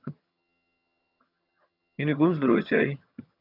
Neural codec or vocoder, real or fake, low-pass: vocoder, 22.05 kHz, 80 mel bands, HiFi-GAN; fake; 5.4 kHz